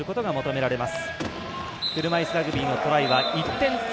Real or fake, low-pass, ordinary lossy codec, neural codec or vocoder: real; none; none; none